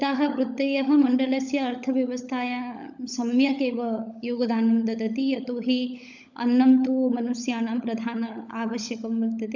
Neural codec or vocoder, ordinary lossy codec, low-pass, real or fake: codec, 16 kHz, 16 kbps, FunCodec, trained on LibriTTS, 50 frames a second; none; 7.2 kHz; fake